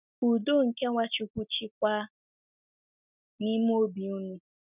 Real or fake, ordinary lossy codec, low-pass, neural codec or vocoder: real; none; 3.6 kHz; none